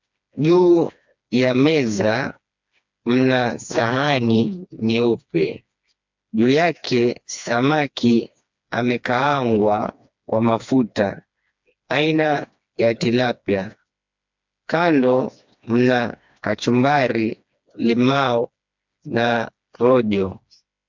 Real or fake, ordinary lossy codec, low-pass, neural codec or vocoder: fake; MP3, 64 kbps; 7.2 kHz; codec, 16 kHz, 2 kbps, FreqCodec, smaller model